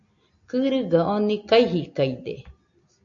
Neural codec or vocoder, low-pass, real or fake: none; 7.2 kHz; real